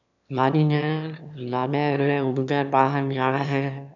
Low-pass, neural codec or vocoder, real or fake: 7.2 kHz; autoencoder, 22.05 kHz, a latent of 192 numbers a frame, VITS, trained on one speaker; fake